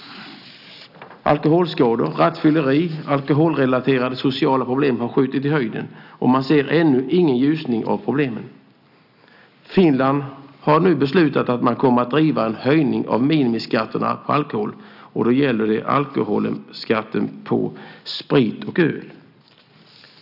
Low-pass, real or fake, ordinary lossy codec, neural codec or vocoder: 5.4 kHz; real; none; none